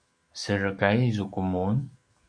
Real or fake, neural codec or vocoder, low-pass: fake; codec, 44.1 kHz, 7.8 kbps, DAC; 9.9 kHz